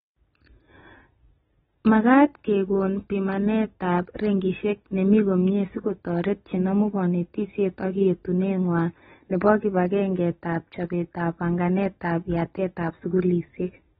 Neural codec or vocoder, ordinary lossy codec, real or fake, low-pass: none; AAC, 16 kbps; real; 7.2 kHz